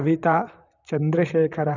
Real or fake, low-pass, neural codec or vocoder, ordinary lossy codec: fake; 7.2 kHz; vocoder, 44.1 kHz, 128 mel bands, Pupu-Vocoder; none